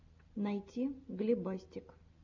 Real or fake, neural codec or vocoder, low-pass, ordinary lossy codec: real; none; 7.2 kHz; MP3, 64 kbps